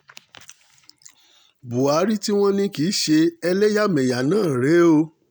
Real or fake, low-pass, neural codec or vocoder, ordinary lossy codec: real; none; none; none